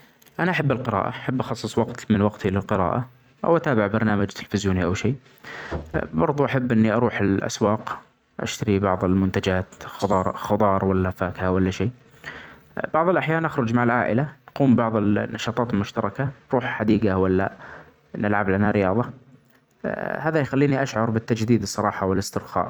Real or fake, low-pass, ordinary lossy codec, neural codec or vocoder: fake; 19.8 kHz; none; vocoder, 44.1 kHz, 128 mel bands every 256 samples, BigVGAN v2